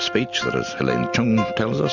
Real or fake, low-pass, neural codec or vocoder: real; 7.2 kHz; none